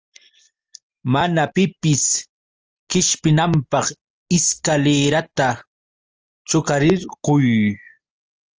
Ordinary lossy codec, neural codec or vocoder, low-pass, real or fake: Opus, 24 kbps; none; 7.2 kHz; real